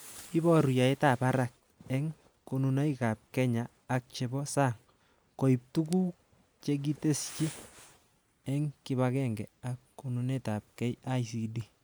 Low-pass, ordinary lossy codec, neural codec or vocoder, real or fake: none; none; none; real